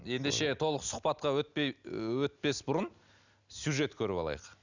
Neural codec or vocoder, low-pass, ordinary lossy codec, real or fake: none; 7.2 kHz; none; real